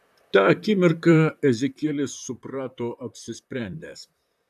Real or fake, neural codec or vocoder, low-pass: fake; vocoder, 44.1 kHz, 128 mel bands, Pupu-Vocoder; 14.4 kHz